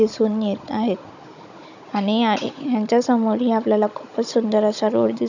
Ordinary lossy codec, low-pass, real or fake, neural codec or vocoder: none; 7.2 kHz; fake; codec, 16 kHz, 16 kbps, FunCodec, trained on Chinese and English, 50 frames a second